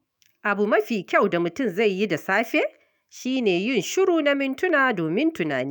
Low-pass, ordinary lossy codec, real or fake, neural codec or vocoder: none; none; fake; autoencoder, 48 kHz, 128 numbers a frame, DAC-VAE, trained on Japanese speech